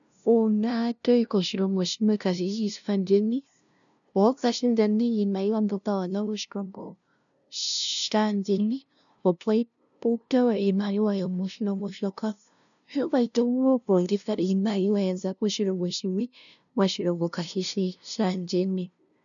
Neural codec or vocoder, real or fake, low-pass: codec, 16 kHz, 0.5 kbps, FunCodec, trained on LibriTTS, 25 frames a second; fake; 7.2 kHz